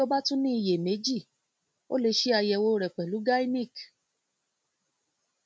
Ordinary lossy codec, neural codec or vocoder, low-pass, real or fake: none; none; none; real